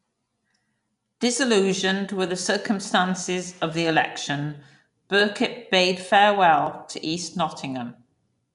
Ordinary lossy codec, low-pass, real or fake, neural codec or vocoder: none; 10.8 kHz; fake; vocoder, 24 kHz, 100 mel bands, Vocos